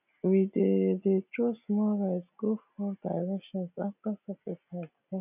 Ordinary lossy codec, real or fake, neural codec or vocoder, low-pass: none; real; none; 3.6 kHz